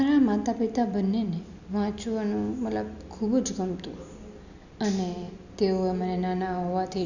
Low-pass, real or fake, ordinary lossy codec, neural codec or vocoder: 7.2 kHz; real; none; none